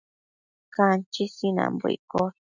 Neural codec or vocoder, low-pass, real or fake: none; 7.2 kHz; real